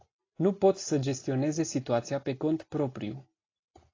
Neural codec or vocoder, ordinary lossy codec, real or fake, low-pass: none; AAC, 32 kbps; real; 7.2 kHz